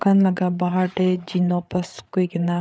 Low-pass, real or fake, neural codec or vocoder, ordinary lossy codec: none; fake; codec, 16 kHz, 8 kbps, FreqCodec, larger model; none